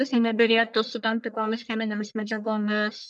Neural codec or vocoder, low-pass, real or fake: codec, 44.1 kHz, 1.7 kbps, Pupu-Codec; 10.8 kHz; fake